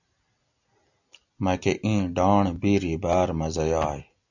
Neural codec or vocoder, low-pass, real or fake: none; 7.2 kHz; real